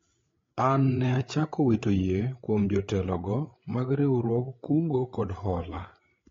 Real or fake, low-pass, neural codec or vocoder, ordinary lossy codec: fake; 7.2 kHz; codec, 16 kHz, 8 kbps, FreqCodec, larger model; AAC, 32 kbps